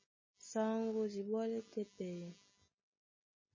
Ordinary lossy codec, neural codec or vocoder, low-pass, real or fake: MP3, 32 kbps; none; 7.2 kHz; real